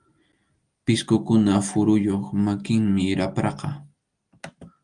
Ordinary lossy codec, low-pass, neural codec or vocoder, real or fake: Opus, 32 kbps; 9.9 kHz; none; real